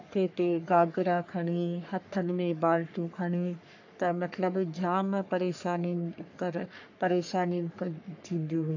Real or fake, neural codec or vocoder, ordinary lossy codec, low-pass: fake; codec, 44.1 kHz, 3.4 kbps, Pupu-Codec; AAC, 48 kbps; 7.2 kHz